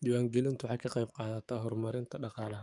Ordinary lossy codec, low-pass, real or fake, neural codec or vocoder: none; 10.8 kHz; fake; codec, 44.1 kHz, 7.8 kbps, DAC